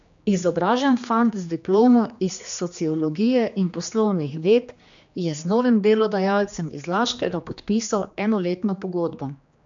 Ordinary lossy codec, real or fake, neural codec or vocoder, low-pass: MP3, 64 kbps; fake; codec, 16 kHz, 2 kbps, X-Codec, HuBERT features, trained on general audio; 7.2 kHz